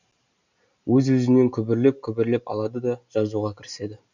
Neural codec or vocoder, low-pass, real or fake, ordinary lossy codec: none; 7.2 kHz; real; none